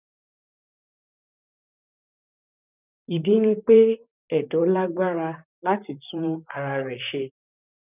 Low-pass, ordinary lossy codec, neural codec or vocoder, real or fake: 3.6 kHz; none; vocoder, 44.1 kHz, 128 mel bands, Pupu-Vocoder; fake